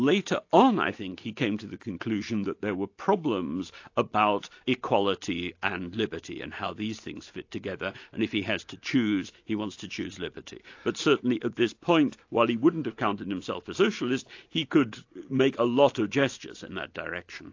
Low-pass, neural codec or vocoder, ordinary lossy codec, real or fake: 7.2 kHz; none; AAC, 48 kbps; real